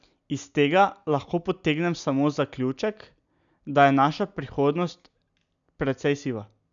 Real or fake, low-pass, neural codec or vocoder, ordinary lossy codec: real; 7.2 kHz; none; none